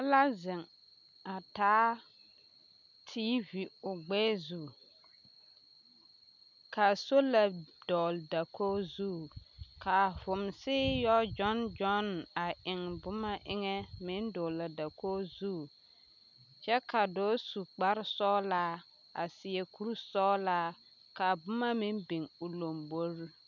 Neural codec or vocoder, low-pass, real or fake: none; 7.2 kHz; real